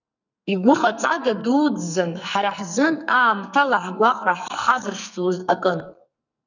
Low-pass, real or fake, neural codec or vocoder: 7.2 kHz; fake; codec, 44.1 kHz, 2.6 kbps, SNAC